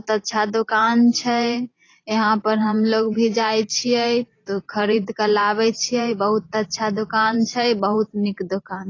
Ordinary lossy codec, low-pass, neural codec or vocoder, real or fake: AAC, 32 kbps; 7.2 kHz; vocoder, 44.1 kHz, 128 mel bands every 512 samples, BigVGAN v2; fake